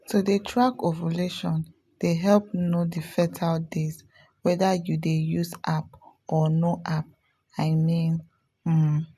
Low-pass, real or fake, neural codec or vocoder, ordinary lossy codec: 14.4 kHz; real; none; none